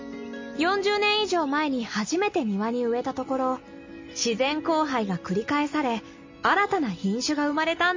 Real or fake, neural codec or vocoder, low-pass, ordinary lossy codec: real; none; 7.2 kHz; MP3, 32 kbps